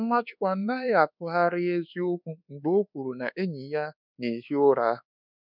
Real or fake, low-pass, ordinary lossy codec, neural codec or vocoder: fake; 5.4 kHz; none; codec, 24 kHz, 1.2 kbps, DualCodec